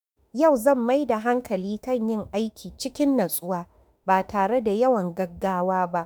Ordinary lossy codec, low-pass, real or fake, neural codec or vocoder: none; none; fake; autoencoder, 48 kHz, 32 numbers a frame, DAC-VAE, trained on Japanese speech